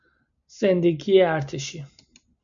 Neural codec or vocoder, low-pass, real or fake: none; 7.2 kHz; real